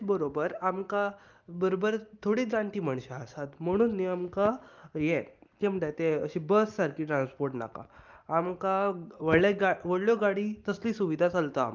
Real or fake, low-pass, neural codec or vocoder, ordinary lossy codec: real; 7.2 kHz; none; Opus, 24 kbps